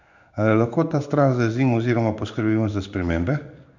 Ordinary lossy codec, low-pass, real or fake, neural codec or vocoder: none; 7.2 kHz; fake; codec, 16 kHz in and 24 kHz out, 1 kbps, XY-Tokenizer